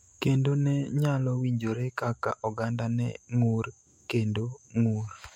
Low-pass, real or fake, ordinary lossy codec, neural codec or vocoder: 19.8 kHz; fake; MP3, 64 kbps; autoencoder, 48 kHz, 128 numbers a frame, DAC-VAE, trained on Japanese speech